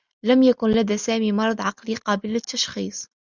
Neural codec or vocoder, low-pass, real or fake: none; 7.2 kHz; real